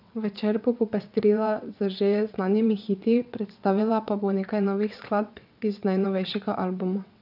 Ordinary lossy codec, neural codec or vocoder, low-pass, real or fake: none; vocoder, 22.05 kHz, 80 mel bands, WaveNeXt; 5.4 kHz; fake